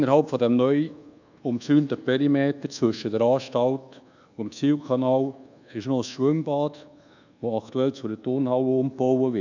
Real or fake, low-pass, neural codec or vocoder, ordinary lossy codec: fake; 7.2 kHz; codec, 24 kHz, 1.2 kbps, DualCodec; none